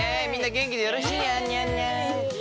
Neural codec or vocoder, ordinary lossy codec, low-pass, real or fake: none; none; none; real